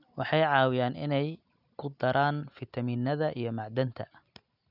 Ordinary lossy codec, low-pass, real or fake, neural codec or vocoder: none; 5.4 kHz; real; none